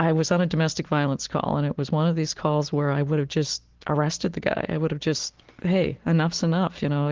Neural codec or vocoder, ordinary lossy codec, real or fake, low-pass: none; Opus, 16 kbps; real; 7.2 kHz